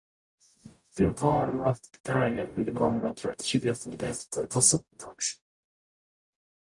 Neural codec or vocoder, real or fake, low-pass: codec, 44.1 kHz, 0.9 kbps, DAC; fake; 10.8 kHz